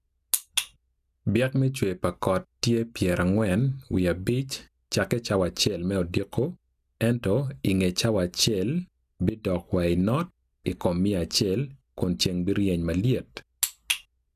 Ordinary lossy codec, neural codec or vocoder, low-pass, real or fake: none; none; 14.4 kHz; real